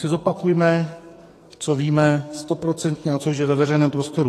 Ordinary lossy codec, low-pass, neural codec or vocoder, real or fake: AAC, 48 kbps; 14.4 kHz; codec, 44.1 kHz, 2.6 kbps, SNAC; fake